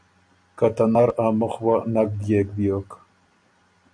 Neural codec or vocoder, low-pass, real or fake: none; 9.9 kHz; real